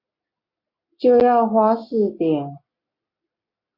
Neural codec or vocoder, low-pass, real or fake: none; 5.4 kHz; real